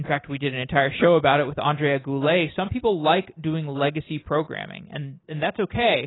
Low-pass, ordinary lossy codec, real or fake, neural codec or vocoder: 7.2 kHz; AAC, 16 kbps; real; none